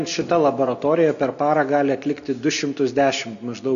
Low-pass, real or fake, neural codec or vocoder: 7.2 kHz; real; none